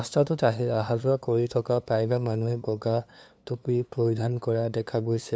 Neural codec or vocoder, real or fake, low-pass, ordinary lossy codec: codec, 16 kHz, 2 kbps, FunCodec, trained on LibriTTS, 25 frames a second; fake; none; none